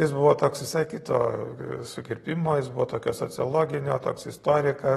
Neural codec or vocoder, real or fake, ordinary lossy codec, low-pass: none; real; AAC, 32 kbps; 19.8 kHz